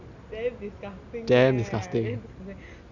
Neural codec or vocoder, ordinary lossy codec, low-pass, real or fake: none; none; 7.2 kHz; real